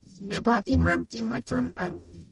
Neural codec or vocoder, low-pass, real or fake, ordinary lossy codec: codec, 44.1 kHz, 0.9 kbps, DAC; 19.8 kHz; fake; MP3, 48 kbps